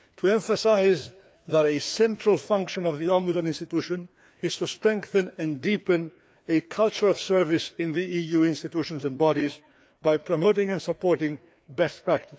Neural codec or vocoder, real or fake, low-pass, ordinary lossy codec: codec, 16 kHz, 2 kbps, FreqCodec, larger model; fake; none; none